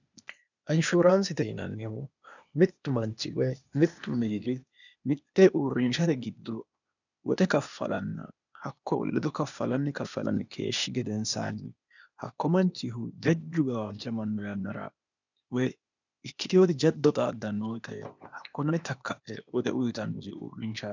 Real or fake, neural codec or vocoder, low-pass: fake; codec, 16 kHz, 0.8 kbps, ZipCodec; 7.2 kHz